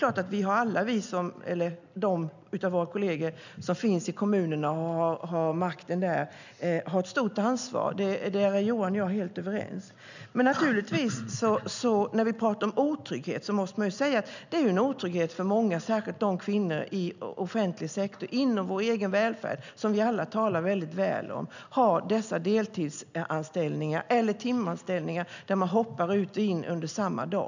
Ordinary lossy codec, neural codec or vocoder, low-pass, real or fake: none; none; 7.2 kHz; real